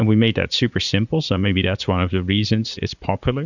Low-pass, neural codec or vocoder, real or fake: 7.2 kHz; vocoder, 44.1 kHz, 80 mel bands, Vocos; fake